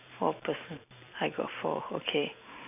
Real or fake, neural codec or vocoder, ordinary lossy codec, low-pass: fake; vocoder, 44.1 kHz, 128 mel bands every 512 samples, BigVGAN v2; none; 3.6 kHz